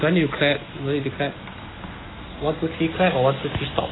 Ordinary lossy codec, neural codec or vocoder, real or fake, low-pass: AAC, 16 kbps; none; real; 7.2 kHz